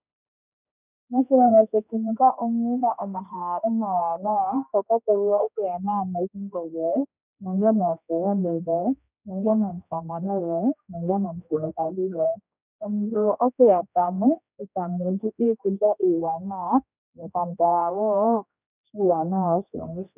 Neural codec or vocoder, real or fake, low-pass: codec, 16 kHz, 1 kbps, X-Codec, HuBERT features, trained on general audio; fake; 3.6 kHz